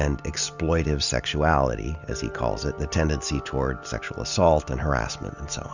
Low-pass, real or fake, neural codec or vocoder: 7.2 kHz; real; none